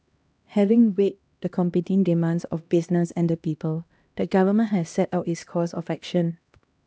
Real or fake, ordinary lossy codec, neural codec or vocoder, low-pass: fake; none; codec, 16 kHz, 1 kbps, X-Codec, HuBERT features, trained on LibriSpeech; none